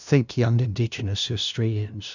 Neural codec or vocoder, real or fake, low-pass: codec, 16 kHz, 0.5 kbps, FunCodec, trained on LibriTTS, 25 frames a second; fake; 7.2 kHz